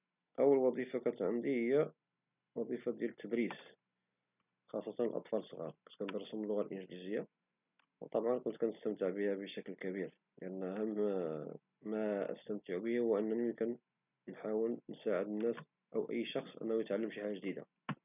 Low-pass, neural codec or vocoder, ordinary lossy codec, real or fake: 3.6 kHz; none; none; real